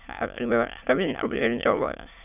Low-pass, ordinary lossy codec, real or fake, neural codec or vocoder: 3.6 kHz; none; fake; autoencoder, 22.05 kHz, a latent of 192 numbers a frame, VITS, trained on many speakers